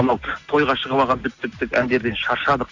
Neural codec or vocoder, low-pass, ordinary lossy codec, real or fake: none; 7.2 kHz; AAC, 48 kbps; real